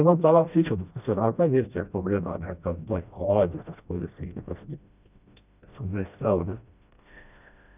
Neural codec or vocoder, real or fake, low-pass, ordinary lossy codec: codec, 16 kHz, 1 kbps, FreqCodec, smaller model; fake; 3.6 kHz; none